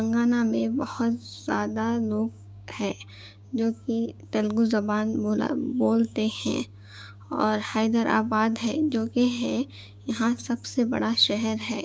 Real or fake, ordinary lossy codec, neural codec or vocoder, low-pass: fake; none; codec, 16 kHz, 6 kbps, DAC; none